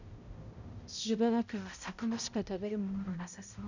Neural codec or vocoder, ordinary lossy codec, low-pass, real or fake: codec, 16 kHz, 0.5 kbps, X-Codec, HuBERT features, trained on balanced general audio; none; 7.2 kHz; fake